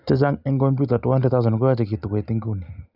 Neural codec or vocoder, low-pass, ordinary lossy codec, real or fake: none; 5.4 kHz; none; real